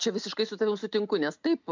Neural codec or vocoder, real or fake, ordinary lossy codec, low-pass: none; real; MP3, 64 kbps; 7.2 kHz